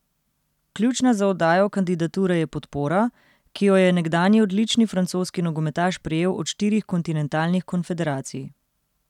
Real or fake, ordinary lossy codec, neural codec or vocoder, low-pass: real; none; none; 19.8 kHz